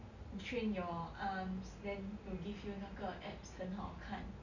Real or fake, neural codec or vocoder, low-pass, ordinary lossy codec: real; none; 7.2 kHz; none